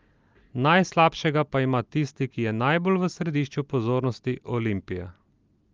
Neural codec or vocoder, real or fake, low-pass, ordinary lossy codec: none; real; 7.2 kHz; Opus, 32 kbps